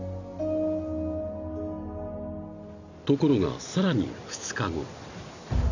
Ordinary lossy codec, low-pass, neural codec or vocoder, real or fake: none; 7.2 kHz; none; real